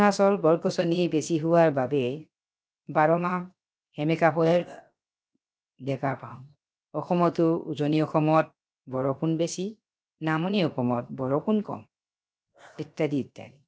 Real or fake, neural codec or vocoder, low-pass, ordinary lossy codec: fake; codec, 16 kHz, 0.7 kbps, FocalCodec; none; none